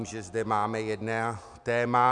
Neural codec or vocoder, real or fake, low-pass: none; real; 10.8 kHz